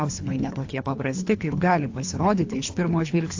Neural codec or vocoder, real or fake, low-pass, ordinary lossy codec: codec, 24 kHz, 3 kbps, HILCodec; fake; 7.2 kHz; AAC, 48 kbps